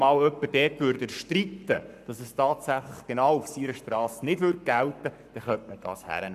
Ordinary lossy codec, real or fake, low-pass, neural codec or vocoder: none; fake; 14.4 kHz; codec, 44.1 kHz, 7.8 kbps, Pupu-Codec